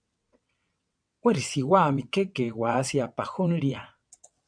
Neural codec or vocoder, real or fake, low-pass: vocoder, 22.05 kHz, 80 mel bands, WaveNeXt; fake; 9.9 kHz